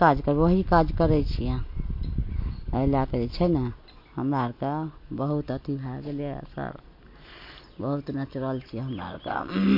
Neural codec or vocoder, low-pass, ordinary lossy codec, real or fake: none; 5.4 kHz; MP3, 32 kbps; real